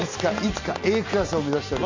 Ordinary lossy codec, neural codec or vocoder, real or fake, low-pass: MP3, 48 kbps; none; real; 7.2 kHz